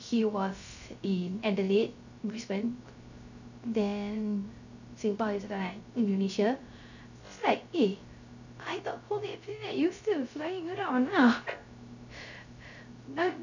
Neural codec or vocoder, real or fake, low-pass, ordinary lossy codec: codec, 16 kHz, 0.3 kbps, FocalCodec; fake; 7.2 kHz; none